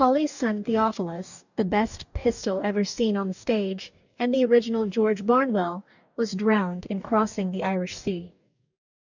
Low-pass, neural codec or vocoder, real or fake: 7.2 kHz; codec, 44.1 kHz, 2.6 kbps, DAC; fake